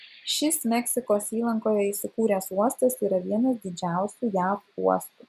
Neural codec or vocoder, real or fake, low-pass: none; real; 14.4 kHz